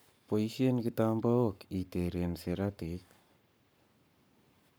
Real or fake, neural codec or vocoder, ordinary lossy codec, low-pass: fake; codec, 44.1 kHz, 7.8 kbps, Pupu-Codec; none; none